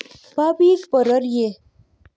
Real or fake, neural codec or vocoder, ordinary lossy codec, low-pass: real; none; none; none